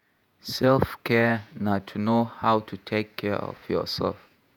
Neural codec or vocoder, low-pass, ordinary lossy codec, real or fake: vocoder, 48 kHz, 128 mel bands, Vocos; none; none; fake